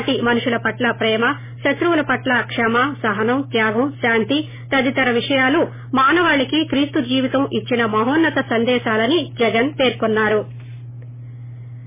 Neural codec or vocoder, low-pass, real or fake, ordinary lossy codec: none; 3.6 kHz; real; MP3, 16 kbps